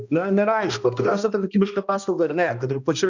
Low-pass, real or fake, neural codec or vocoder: 7.2 kHz; fake; codec, 16 kHz, 1 kbps, X-Codec, HuBERT features, trained on balanced general audio